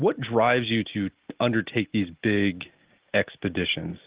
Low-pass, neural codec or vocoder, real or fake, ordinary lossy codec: 3.6 kHz; none; real; Opus, 16 kbps